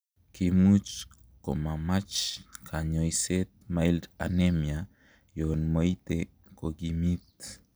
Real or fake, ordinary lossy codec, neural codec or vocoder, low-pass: real; none; none; none